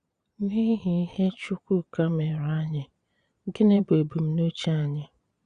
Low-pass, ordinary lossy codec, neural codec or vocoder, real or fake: 9.9 kHz; Opus, 64 kbps; vocoder, 22.05 kHz, 80 mel bands, Vocos; fake